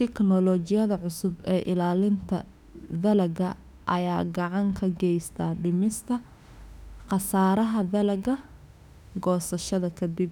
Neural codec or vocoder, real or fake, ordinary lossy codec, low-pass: autoencoder, 48 kHz, 32 numbers a frame, DAC-VAE, trained on Japanese speech; fake; none; 19.8 kHz